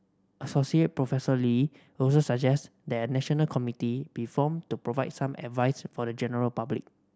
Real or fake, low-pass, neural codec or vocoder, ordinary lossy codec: real; none; none; none